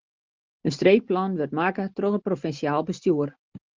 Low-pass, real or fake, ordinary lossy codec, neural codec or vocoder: 7.2 kHz; real; Opus, 32 kbps; none